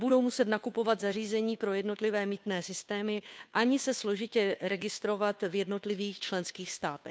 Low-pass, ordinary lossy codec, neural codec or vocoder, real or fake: none; none; codec, 16 kHz, 2 kbps, FunCodec, trained on Chinese and English, 25 frames a second; fake